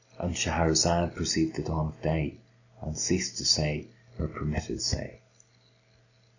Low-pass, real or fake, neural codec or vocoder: 7.2 kHz; real; none